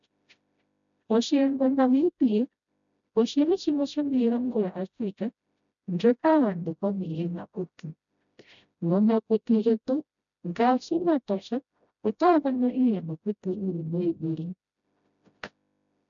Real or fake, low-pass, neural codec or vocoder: fake; 7.2 kHz; codec, 16 kHz, 0.5 kbps, FreqCodec, smaller model